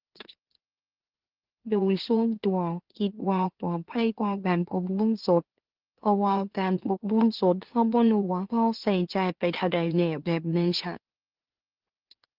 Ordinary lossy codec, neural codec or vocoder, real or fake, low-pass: Opus, 16 kbps; autoencoder, 44.1 kHz, a latent of 192 numbers a frame, MeloTTS; fake; 5.4 kHz